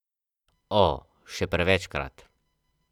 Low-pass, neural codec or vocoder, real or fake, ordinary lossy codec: 19.8 kHz; none; real; none